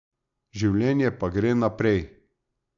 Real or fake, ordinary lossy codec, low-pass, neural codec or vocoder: real; none; 7.2 kHz; none